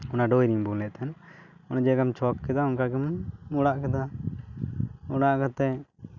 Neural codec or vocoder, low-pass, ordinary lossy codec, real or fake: none; 7.2 kHz; none; real